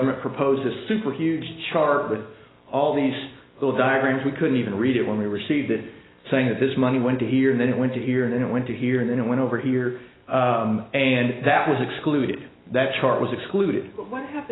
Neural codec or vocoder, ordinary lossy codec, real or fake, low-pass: none; AAC, 16 kbps; real; 7.2 kHz